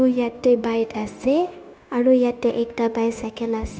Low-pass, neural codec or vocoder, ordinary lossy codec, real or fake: none; codec, 16 kHz, 0.9 kbps, LongCat-Audio-Codec; none; fake